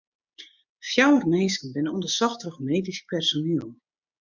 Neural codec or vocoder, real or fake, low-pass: codec, 16 kHz, 6 kbps, DAC; fake; 7.2 kHz